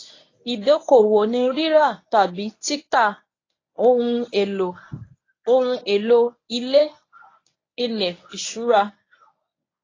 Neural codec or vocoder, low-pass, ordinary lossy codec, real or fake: codec, 24 kHz, 0.9 kbps, WavTokenizer, medium speech release version 1; 7.2 kHz; AAC, 32 kbps; fake